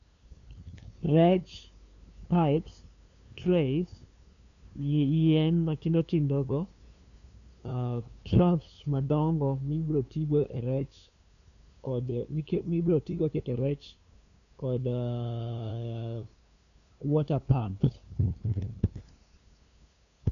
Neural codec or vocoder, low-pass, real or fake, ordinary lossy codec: codec, 16 kHz, 2 kbps, FunCodec, trained on LibriTTS, 25 frames a second; 7.2 kHz; fake; none